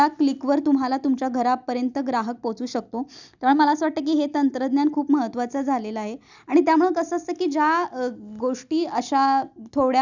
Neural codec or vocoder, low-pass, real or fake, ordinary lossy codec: none; 7.2 kHz; real; none